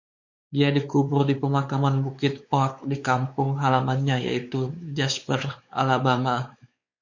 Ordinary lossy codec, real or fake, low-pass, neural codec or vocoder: MP3, 48 kbps; fake; 7.2 kHz; codec, 16 kHz, 4 kbps, X-Codec, WavLM features, trained on Multilingual LibriSpeech